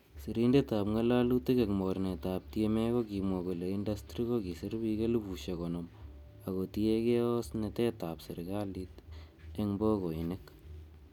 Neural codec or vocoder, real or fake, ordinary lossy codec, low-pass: none; real; none; 19.8 kHz